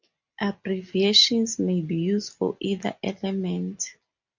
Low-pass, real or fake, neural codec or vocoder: 7.2 kHz; real; none